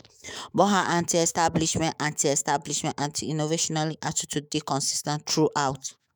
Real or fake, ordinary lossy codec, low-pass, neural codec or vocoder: fake; none; none; autoencoder, 48 kHz, 128 numbers a frame, DAC-VAE, trained on Japanese speech